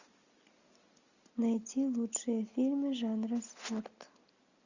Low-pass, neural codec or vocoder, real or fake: 7.2 kHz; none; real